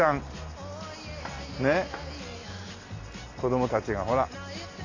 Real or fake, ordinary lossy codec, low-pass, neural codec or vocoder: real; AAC, 32 kbps; 7.2 kHz; none